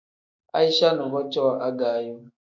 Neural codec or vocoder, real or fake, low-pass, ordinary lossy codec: autoencoder, 48 kHz, 128 numbers a frame, DAC-VAE, trained on Japanese speech; fake; 7.2 kHz; MP3, 48 kbps